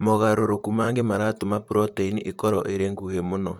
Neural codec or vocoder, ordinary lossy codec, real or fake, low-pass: vocoder, 44.1 kHz, 128 mel bands, Pupu-Vocoder; MP3, 96 kbps; fake; 19.8 kHz